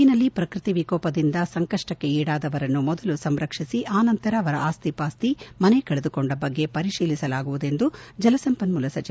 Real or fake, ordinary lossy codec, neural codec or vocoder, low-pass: real; none; none; none